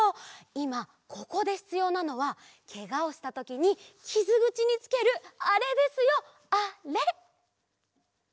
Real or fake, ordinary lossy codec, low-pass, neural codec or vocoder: real; none; none; none